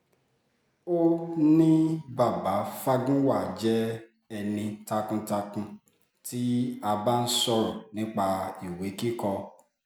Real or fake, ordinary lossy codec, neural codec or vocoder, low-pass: real; none; none; none